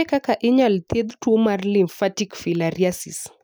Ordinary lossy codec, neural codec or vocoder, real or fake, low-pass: none; none; real; none